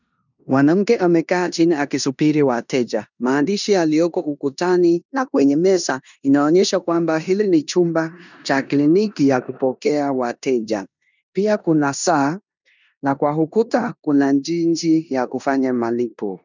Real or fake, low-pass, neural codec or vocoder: fake; 7.2 kHz; codec, 16 kHz in and 24 kHz out, 0.9 kbps, LongCat-Audio-Codec, four codebook decoder